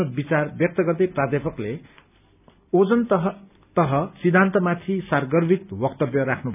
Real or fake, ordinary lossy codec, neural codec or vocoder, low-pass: real; none; none; 3.6 kHz